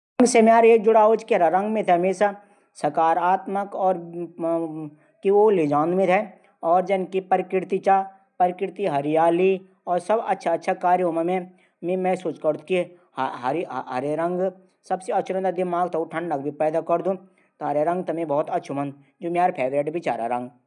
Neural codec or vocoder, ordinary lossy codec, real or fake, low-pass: none; none; real; 10.8 kHz